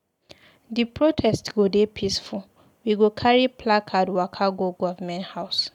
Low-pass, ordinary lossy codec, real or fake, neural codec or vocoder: 19.8 kHz; none; real; none